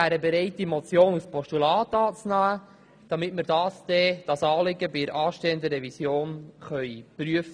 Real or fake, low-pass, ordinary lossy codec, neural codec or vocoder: real; 9.9 kHz; none; none